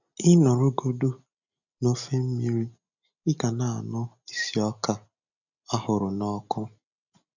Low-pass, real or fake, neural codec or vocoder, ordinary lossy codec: 7.2 kHz; real; none; none